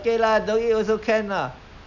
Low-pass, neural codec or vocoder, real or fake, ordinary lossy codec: 7.2 kHz; none; real; none